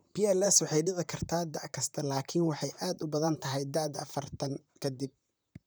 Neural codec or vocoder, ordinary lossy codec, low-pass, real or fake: vocoder, 44.1 kHz, 128 mel bands, Pupu-Vocoder; none; none; fake